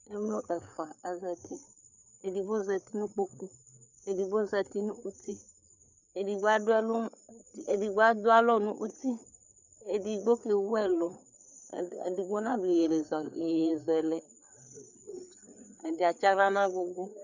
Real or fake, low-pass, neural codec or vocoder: fake; 7.2 kHz; codec, 16 kHz, 4 kbps, FreqCodec, larger model